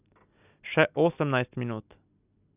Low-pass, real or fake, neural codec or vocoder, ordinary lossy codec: 3.6 kHz; real; none; none